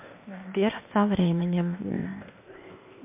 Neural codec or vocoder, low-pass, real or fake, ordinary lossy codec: codec, 16 kHz, 0.8 kbps, ZipCodec; 3.6 kHz; fake; MP3, 32 kbps